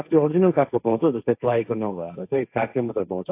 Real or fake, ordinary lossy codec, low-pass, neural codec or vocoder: fake; AAC, 32 kbps; 3.6 kHz; codec, 16 kHz, 1.1 kbps, Voila-Tokenizer